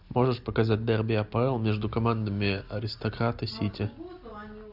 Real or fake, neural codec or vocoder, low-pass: real; none; 5.4 kHz